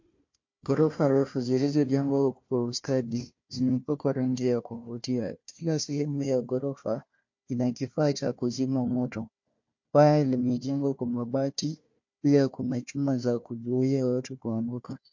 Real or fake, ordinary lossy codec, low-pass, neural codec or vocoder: fake; MP3, 48 kbps; 7.2 kHz; codec, 16 kHz, 1 kbps, FunCodec, trained on Chinese and English, 50 frames a second